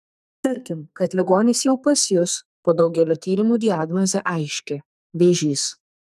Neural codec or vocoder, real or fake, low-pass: codec, 44.1 kHz, 2.6 kbps, SNAC; fake; 14.4 kHz